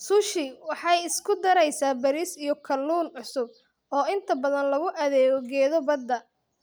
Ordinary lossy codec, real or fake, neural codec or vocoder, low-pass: none; real; none; none